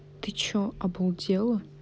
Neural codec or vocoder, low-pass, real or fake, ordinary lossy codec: none; none; real; none